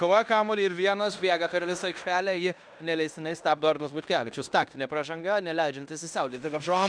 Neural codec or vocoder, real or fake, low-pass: codec, 16 kHz in and 24 kHz out, 0.9 kbps, LongCat-Audio-Codec, fine tuned four codebook decoder; fake; 9.9 kHz